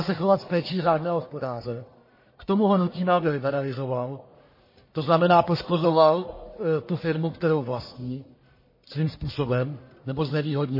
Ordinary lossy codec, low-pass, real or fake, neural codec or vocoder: MP3, 24 kbps; 5.4 kHz; fake; codec, 44.1 kHz, 1.7 kbps, Pupu-Codec